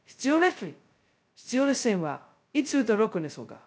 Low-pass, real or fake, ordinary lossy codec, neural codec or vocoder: none; fake; none; codec, 16 kHz, 0.2 kbps, FocalCodec